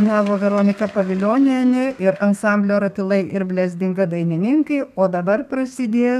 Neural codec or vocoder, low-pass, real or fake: codec, 32 kHz, 1.9 kbps, SNAC; 14.4 kHz; fake